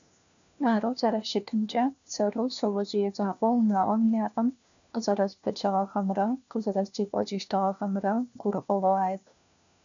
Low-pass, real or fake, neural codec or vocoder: 7.2 kHz; fake; codec, 16 kHz, 1 kbps, FunCodec, trained on LibriTTS, 50 frames a second